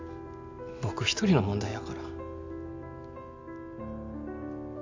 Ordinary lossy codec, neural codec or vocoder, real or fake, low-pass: AAC, 48 kbps; none; real; 7.2 kHz